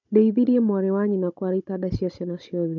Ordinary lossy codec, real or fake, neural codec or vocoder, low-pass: none; fake; codec, 16 kHz, 16 kbps, FunCodec, trained on Chinese and English, 50 frames a second; 7.2 kHz